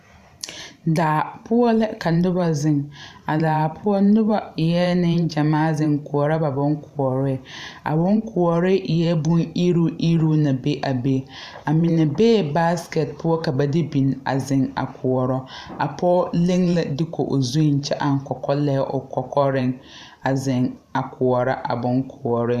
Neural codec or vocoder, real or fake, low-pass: vocoder, 44.1 kHz, 128 mel bands every 512 samples, BigVGAN v2; fake; 14.4 kHz